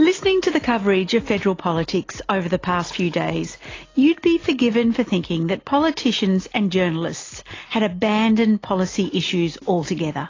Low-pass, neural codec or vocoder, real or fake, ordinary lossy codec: 7.2 kHz; none; real; AAC, 32 kbps